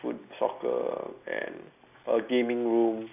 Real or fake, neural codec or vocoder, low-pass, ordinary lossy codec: real; none; 3.6 kHz; none